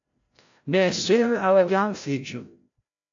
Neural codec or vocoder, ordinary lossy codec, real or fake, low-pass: codec, 16 kHz, 0.5 kbps, FreqCodec, larger model; AAC, 48 kbps; fake; 7.2 kHz